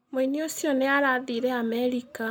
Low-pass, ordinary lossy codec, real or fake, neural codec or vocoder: 19.8 kHz; none; real; none